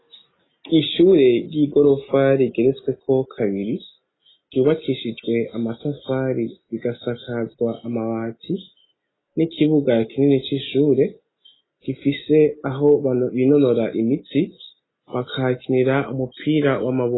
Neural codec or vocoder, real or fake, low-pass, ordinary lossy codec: none; real; 7.2 kHz; AAC, 16 kbps